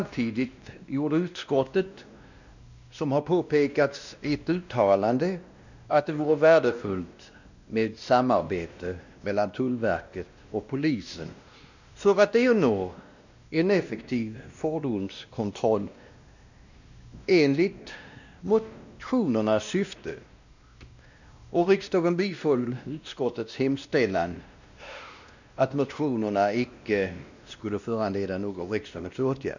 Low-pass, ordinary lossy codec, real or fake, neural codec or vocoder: 7.2 kHz; none; fake; codec, 16 kHz, 1 kbps, X-Codec, WavLM features, trained on Multilingual LibriSpeech